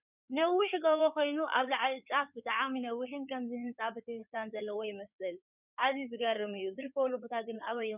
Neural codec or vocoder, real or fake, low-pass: codec, 16 kHz in and 24 kHz out, 2.2 kbps, FireRedTTS-2 codec; fake; 3.6 kHz